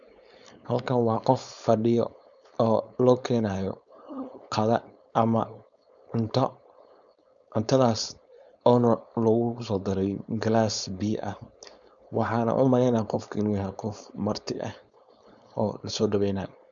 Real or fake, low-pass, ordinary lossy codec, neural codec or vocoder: fake; 7.2 kHz; none; codec, 16 kHz, 4.8 kbps, FACodec